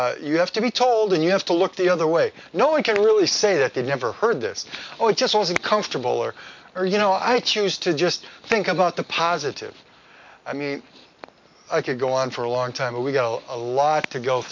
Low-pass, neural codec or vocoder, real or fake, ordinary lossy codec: 7.2 kHz; none; real; MP3, 64 kbps